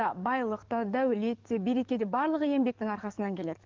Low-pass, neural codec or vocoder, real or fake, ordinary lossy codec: 7.2 kHz; codec, 44.1 kHz, 7.8 kbps, DAC; fake; Opus, 32 kbps